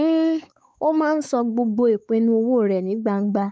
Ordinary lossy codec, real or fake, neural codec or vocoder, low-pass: none; fake; codec, 16 kHz, 4 kbps, X-Codec, WavLM features, trained on Multilingual LibriSpeech; none